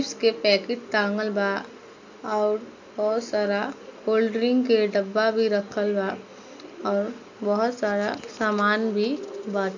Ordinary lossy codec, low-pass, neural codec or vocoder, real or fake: MP3, 48 kbps; 7.2 kHz; none; real